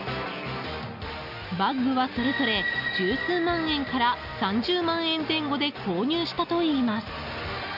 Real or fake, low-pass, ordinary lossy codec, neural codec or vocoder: real; 5.4 kHz; none; none